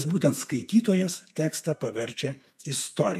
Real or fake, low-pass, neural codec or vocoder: fake; 14.4 kHz; codec, 32 kHz, 1.9 kbps, SNAC